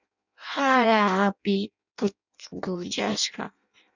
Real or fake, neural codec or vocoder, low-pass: fake; codec, 16 kHz in and 24 kHz out, 0.6 kbps, FireRedTTS-2 codec; 7.2 kHz